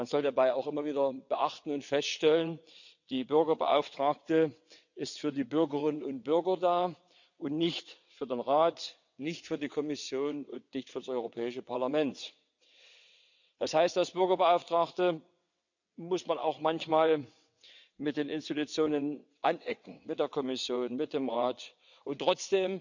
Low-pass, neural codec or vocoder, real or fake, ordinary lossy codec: 7.2 kHz; vocoder, 22.05 kHz, 80 mel bands, WaveNeXt; fake; none